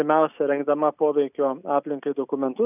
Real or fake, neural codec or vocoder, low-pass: real; none; 3.6 kHz